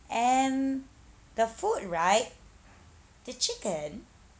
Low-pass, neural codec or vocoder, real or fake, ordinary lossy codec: none; none; real; none